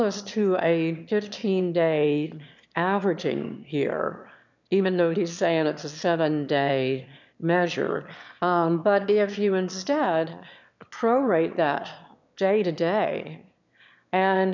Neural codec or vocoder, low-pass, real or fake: autoencoder, 22.05 kHz, a latent of 192 numbers a frame, VITS, trained on one speaker; 7.2 kHz; fake